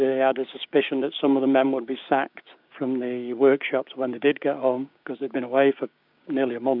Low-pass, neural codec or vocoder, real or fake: 5.4 kHz; codec, 16 kHz, 6 kbps, DAC; fake